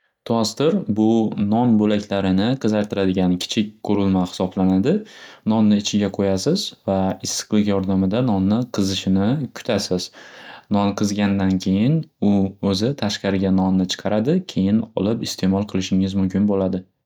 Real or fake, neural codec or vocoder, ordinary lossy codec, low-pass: real; none; none; 19.8 kHz